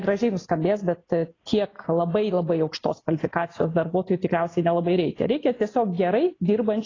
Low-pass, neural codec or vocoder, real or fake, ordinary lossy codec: 7.2 kHz; none; real; AAC, 32 kbps